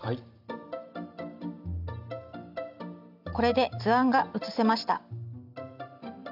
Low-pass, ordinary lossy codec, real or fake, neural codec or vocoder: 5.4 kHz; none; real; none